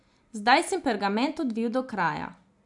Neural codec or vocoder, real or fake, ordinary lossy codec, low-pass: none; real; none; 10.8 kHz